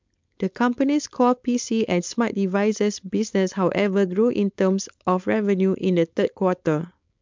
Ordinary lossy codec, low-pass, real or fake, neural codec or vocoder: MP3, 64 kbps; 7.2 kHz; fake; codec, 16 kHz, 4.8 kbps, FACodec